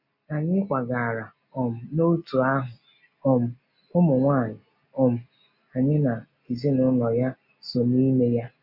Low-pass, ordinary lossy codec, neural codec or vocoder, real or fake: 5.4 kHz; none; none; real